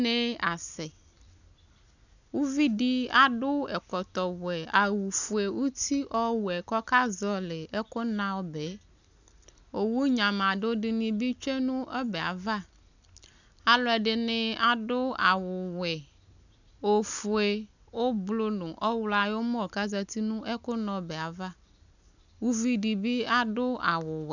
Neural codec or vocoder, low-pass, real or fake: none; 7.2 kHz; real